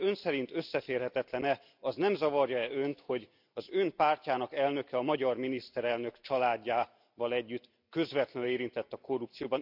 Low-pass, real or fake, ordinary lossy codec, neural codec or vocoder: 5.4 kHz; real; none; none